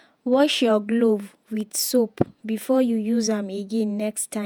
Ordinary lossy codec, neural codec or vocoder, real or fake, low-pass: none; vocoder, 48 kHz, 128 mel bands, Vocos; fake; none